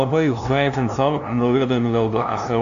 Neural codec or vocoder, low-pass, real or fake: codec, 16 kHz, 0.5 kbps, FunCodec, trained on LibriTTS, 25 frames a second; 7.2 kHz; fake